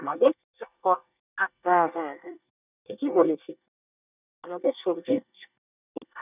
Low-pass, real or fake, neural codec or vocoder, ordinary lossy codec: 3.6 kHz; fake; codec, 24 kHz, 1 kbps, SNAC; none